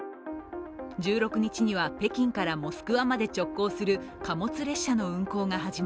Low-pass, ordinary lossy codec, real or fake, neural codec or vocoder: none; none; real; none